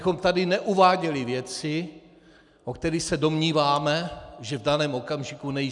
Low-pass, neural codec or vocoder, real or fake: 10.8 kHz; none; real